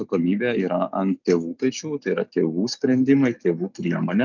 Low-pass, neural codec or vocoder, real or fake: 7.2 kHz; none; real